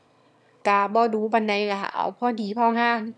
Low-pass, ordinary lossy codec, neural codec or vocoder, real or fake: none; none; autoencoder, 22.05 kHz, a latent of 192 numbers a frame, VITS, trained on one speaker; fake